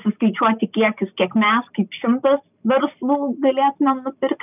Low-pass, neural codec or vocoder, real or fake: 3.6 kHz; none; real